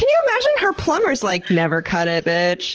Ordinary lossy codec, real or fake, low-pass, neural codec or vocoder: Opus, 16 kbps; fake; 7.2 kHz; vocoder, 44.1 kHz, 128 mel bands, Pupu-Vocoder